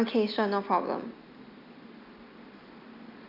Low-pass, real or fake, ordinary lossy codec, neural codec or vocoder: 5.4 kHz; real; none; none